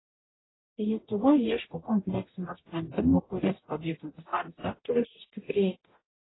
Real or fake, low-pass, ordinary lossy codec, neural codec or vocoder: fake; 7.2 kHz; AAC, 16 kbps; codec, 44.1 kHz, 0.9 kbps, DAC